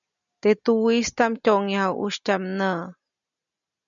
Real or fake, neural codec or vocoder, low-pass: real; none; 7.2 kHz